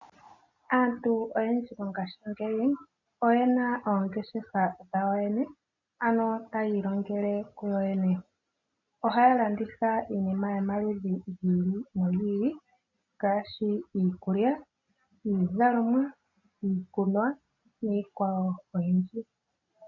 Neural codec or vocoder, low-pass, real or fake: none; 7.2 kHz; real